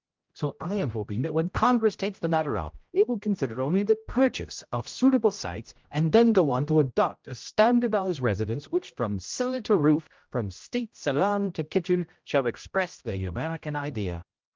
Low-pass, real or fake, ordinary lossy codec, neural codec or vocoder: 7.2 kHz; fake; Opus, 24 kbps; codec, 16 kHz, 0.5 kbps, X-Codec, HuBERT features, trained on general audio